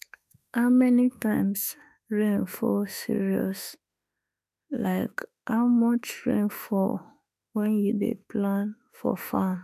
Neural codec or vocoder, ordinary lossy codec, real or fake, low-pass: autoencoder, 48 kHz, 32 numbers a frame, DAC-VAE, trained on Japanese speech; none; fake; 14.4 kHz